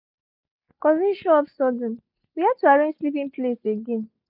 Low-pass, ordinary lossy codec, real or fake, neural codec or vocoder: 5.4 kHz; none; real; none